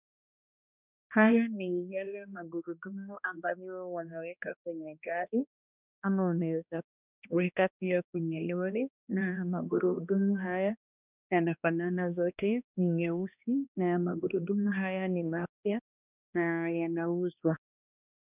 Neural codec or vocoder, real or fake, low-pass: codec, 16 kHz, 1 kbps, X-Codec, HuBERT features, trained on balanced general audio; fake; 3.6 kHz